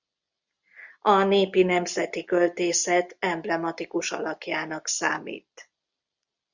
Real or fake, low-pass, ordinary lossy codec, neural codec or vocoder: real; 7.2 kHz; Opus, 64 kbps; none